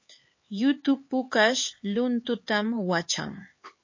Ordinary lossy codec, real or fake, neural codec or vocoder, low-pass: MP3, 32 kbps; fake; codec, 16 kHz, 4 kbps, X-Codec, HuBERT features, trained on LibriSpeech; 7.2 kHz